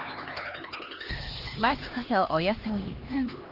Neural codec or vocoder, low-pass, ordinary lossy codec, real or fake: codec, 16 kHz, 2 kbps, X-Codec, HuBERT features, trained on LibriSpeech; 5.4 kHz; Opus, 24 kbps; fake